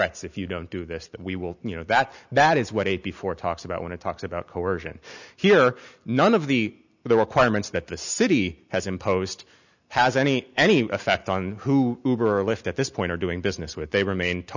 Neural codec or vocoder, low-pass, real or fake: none; 7.2 kHz; real